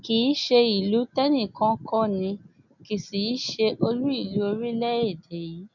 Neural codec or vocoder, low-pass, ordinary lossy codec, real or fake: none; 7.2 kHz; none; real